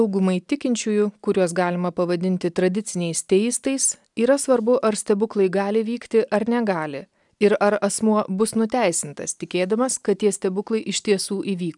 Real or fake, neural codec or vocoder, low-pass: real; none; 10.8 kHz